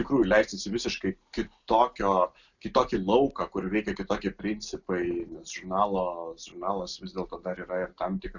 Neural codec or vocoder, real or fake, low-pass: none; real; 7.2 kHz